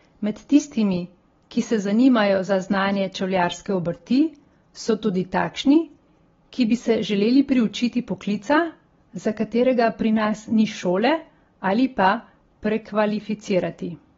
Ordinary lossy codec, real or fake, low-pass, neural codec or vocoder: AAC, 24 kbps; real; 7.2 kHz; none